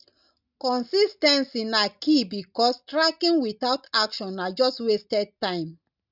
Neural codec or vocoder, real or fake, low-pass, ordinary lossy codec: none; real; 5.4 kHz; none